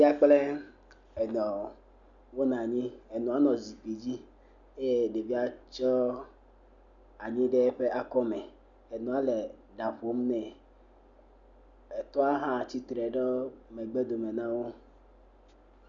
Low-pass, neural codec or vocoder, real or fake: 7.2 kHz; none; real